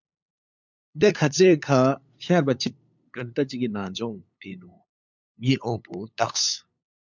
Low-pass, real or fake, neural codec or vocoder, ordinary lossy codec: 7.2 kHz; fake; codec, 16 kHz, 8 kbps, FunCodec, trained on LibriTTS, 25 frames a second; MP3, 64 kbps